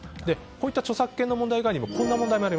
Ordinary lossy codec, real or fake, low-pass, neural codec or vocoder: none; real; none; none